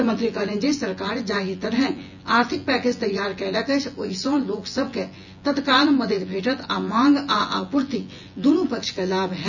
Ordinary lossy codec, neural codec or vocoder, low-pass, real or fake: none; vocoder, 24 kHz, 100 mel bands, Vocos; 7.2 kHz; fake